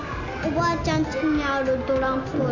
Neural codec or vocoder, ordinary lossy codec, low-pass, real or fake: none; none; 7.2 kHz; real